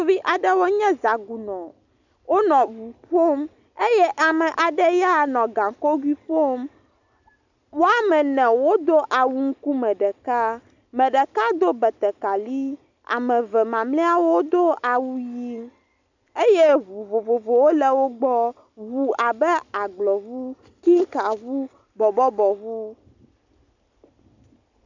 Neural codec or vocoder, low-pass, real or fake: none; 7.2 kHz; real